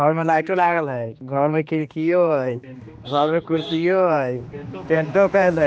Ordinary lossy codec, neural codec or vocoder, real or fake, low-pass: none; codec, 16 kHz, 2 kbps, X-Codec, HuBERT features, trained on general audio; fake; none